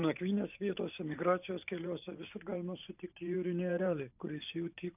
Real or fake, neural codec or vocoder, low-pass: fake; vocoder, 44.1 kHz, 128 mel bands every 512 samples, BigVGAN v2; 3.6 kHz